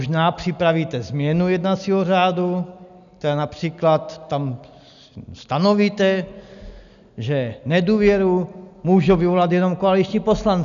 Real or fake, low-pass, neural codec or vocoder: real; 7.2 kHz; none